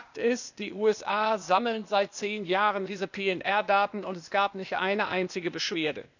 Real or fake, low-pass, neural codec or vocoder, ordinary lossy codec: fake; 7.2 kHz; codec, 16 kHz, 0.8 kbps, ZipCodec; none